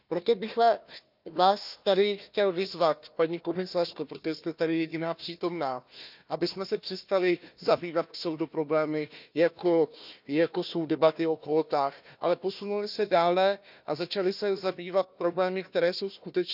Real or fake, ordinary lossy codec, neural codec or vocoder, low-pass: fake; none; codec, 16 kHz, 1 kbps, FunCodec, trained on Chinese and English, 50 frames a second; 5.4 kHz